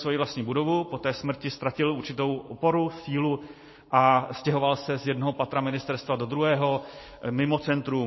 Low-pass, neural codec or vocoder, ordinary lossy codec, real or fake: 7.2 kHz; none; MP3, 24 kbps; real